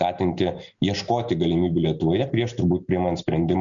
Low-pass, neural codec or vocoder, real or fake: 7.2 kHz; none; real